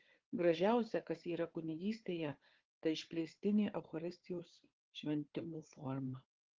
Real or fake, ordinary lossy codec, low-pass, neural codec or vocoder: fake; Opus, 16 kbps; 7.2 kHz; codec, 16 kHz, 4 kbps, FunCodec, trained on Chinese and English, 50 frames a second